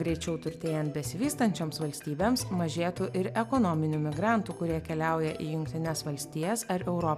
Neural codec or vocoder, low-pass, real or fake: none; 14.4 kHz; real